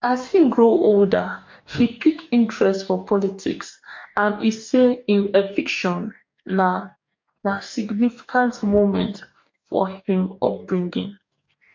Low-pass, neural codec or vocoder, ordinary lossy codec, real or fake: 7.2 kHz; codec, 44.1 kHz, 2.6 kbps, DAC; MP3, 48 kbps; fake